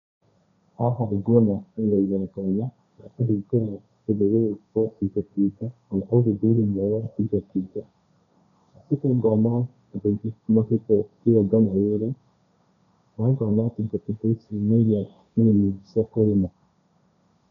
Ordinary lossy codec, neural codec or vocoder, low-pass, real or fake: none; codec, 16 kHz, 1.1 kbps, Voila-Tokenizer; 7.2 kHz; fake